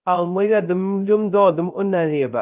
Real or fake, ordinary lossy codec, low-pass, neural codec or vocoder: fake; Opus, 24 kbps; 3.6 kHz; codec, 16 kHz, 0.3 kbps, FocalCodec